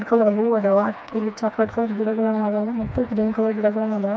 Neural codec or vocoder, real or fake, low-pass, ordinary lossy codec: codec, 16 kHz, 2 kbps, FreqCodec, smaller model; fake; none; none